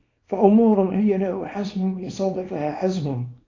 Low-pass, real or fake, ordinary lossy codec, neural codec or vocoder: 7.2 kHz; fake; AAC, 32 kbps; codec, 24 kHz, 0.9 kbps, WavTokenizer, small release